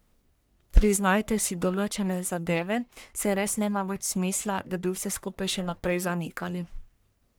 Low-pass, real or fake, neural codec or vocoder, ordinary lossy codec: none; fake; codec, 44.1 kHz, 1.7 kbps, Pupu-Codec; none